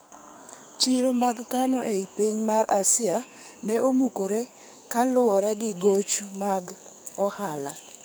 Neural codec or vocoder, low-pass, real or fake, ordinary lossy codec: codec, 44.1 kHz, 2.6 kbps, SNAC; none; fake; none